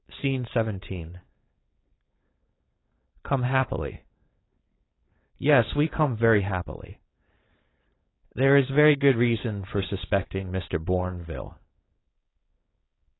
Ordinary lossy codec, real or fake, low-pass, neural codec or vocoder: AAC, 16 kbps; fake; 7.2 kHz; codec, 16 kHz, 4.8 kbps, FACodec